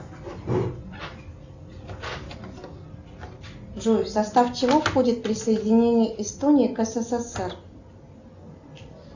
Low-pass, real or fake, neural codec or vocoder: 7.2 kHz; real; none